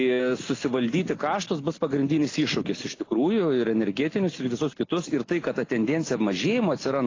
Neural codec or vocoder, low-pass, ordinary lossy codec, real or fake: none; 7.2 kHz; AAC, 32 kbps; real